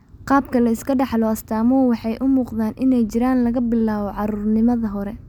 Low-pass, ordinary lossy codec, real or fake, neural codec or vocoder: 19.8 kHz; none; real; none